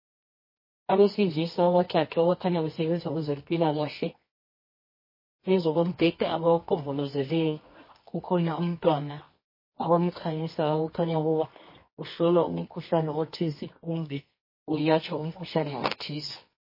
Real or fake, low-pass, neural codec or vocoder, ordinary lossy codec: fake; 5.4 kHz; codec, 24 kHz, 0.9 kbps, WavTokenizer, medium music audio release; MP3, 24 kbps